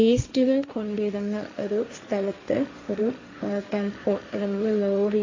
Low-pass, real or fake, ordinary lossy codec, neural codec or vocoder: 7.2 kHz; fake; AAC, 32 kbps; codec, 16 kHz, 1.1 kbps, Voila-Tokenizer